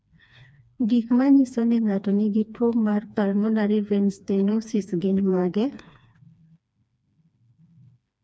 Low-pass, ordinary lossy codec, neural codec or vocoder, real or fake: none; none; codec, 16 kHz, 2 kbps, FreqCodec, smaller model; fake